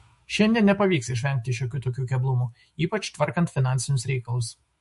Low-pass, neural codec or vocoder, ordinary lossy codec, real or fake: 14.4 kHz; autoencoder, 48 kHz, 128 numbers a frame, DAC-VAE, trained on Japanese speech; MP3, 48 kbps; fake